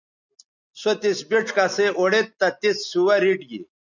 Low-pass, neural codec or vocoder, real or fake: 7.2 kHz; none; real